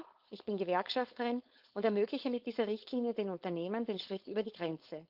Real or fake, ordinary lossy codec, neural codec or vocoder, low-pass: fake; Opus, 24 kbps; codec, 16 kHz, 4.8 kbps, FACodec; 5.4 kHz